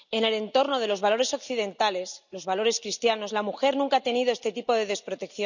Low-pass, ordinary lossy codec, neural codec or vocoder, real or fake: 7.2 kHz; none; none; real